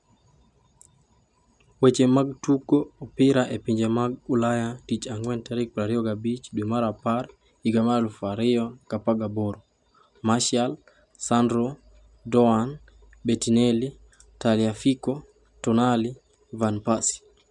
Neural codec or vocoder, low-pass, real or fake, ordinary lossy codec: none; 10.8 kHz; real; none